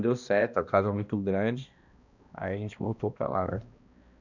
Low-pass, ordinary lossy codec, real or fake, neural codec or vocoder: 7.2 kHz; none; fake; codec, 16 kHz, 1 kbps, X-Codec, HuBERT features, trained on balanced general audio